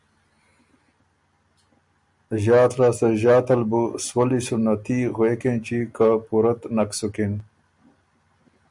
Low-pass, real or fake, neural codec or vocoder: 10.8 kHz; real; none